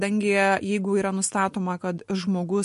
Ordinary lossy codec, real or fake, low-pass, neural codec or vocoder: MP3, 48 kbps; real; 14.4 kHz; none